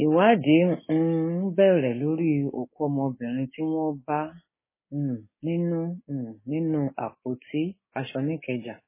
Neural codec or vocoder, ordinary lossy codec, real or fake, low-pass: codec, 16 kHz, 6 kbps, DAC; MP3, 16 kbps; fake; 3.6 kHz